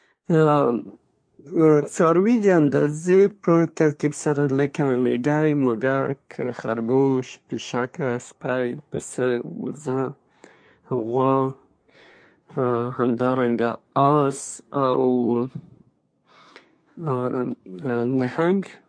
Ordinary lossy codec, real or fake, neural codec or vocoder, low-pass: MP3, 48 kbps; fake; codec, 24 kHz, 1 kbps, SNAC; 9.9 kHz